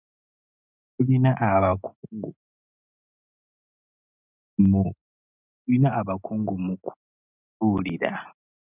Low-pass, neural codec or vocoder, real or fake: 3.6 kHz; none; real